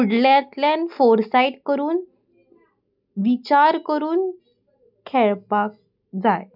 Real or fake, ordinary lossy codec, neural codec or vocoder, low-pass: real; none; none; 5.4 kHz